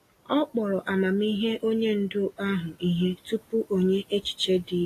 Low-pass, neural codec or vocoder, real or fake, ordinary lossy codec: 14.4 kHz; none; real; AAC, 48 kbps